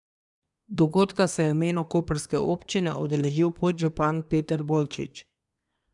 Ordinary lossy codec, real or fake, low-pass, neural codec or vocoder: none; fake; 10.8 kHz; codec, 24 kHz, 1 kbps, SNAC